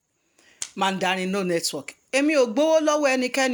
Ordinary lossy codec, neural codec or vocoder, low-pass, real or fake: none; none; none; real